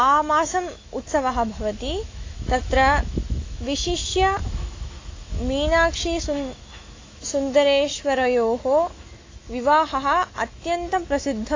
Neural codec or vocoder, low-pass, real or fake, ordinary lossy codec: autoencoder, 48 kHz, 128 numbers a frame, DAC-VAE, trained on Japanese speech; 7.2 kHz; fake; AAC, 32 kbps